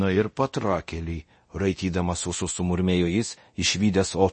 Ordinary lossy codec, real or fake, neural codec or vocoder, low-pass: MP3, 32 kbps; fake; codec, 24 kHz, 0.9 kbps, DualCodec; 10.8 kHz